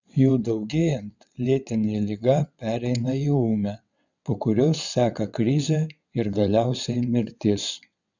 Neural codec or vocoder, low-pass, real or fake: vocoder, 22.05 kHz, 80 mel bands, WaveNeXt; 7.2 kHz; fake